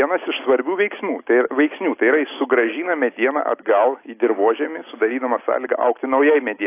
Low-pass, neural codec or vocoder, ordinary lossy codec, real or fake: 3.6 kHz; none; AAC, 24 kbps; real